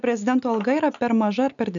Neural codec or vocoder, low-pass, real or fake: none; 7.2 kHz; real